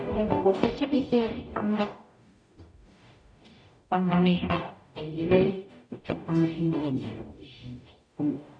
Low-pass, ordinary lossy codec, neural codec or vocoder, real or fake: 9.9 kHz; none; codec, 44.1 kHz, 0.9 kbps, DAC; fake